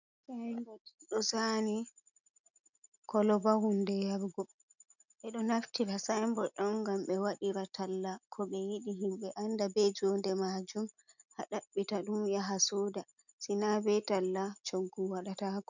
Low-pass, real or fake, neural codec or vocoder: 7.2 kHz; real; none